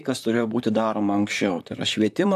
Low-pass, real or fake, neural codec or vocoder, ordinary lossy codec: 14.4 kHz; fake; vocoder, 44.1 kHz, 128 mel bands, Pupu-Vocoder; AAC, 96 kbps